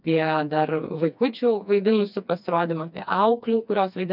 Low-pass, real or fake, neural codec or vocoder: 5.4 kHz; fake; codec, 16 kHz, 2 kbps, FreqCodec, smaller model